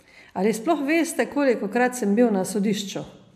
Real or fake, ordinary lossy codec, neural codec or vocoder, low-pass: real; MP3, 96 kbps; none; 14.4 kHz